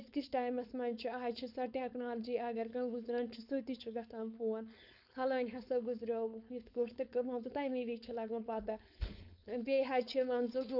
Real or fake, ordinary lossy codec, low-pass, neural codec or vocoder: fake; none; 5.4 kHz; codec, 16 kHz, 4.8 kbps, FACodec